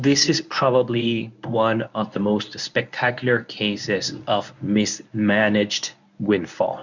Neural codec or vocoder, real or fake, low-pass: codec, 24 kHz, 0.9 kbps, WavTokenizer, medium speech release version 1; fake; 7.2 kHz